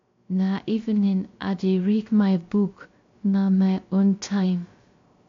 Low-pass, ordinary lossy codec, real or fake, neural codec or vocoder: 7.2 kHz; AAC, 48 kbps; fake; codec, 16 kHz, 0.3 kbps, FocalCodec